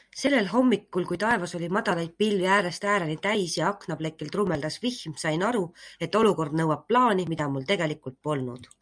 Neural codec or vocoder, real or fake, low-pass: none; real; 9.9 kHz